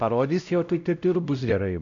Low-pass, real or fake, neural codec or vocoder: 7.2 kHz; fake; codec, 16 kHz, 0.5 kbps, X-Codec, WavLM features, trained on Multilingual LibriSpeech